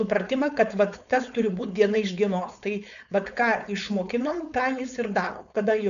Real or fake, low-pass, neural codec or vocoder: fake; 7.2 kHz; codec, 16 kHz, 4.8 kbps, FACodec